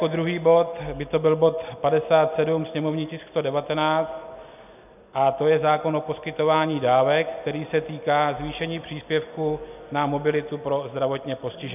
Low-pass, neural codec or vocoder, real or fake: 3.6 kHz; none; real